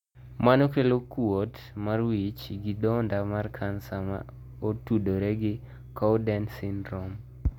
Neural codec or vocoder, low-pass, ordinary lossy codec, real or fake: none; 19.8 kHz; none; real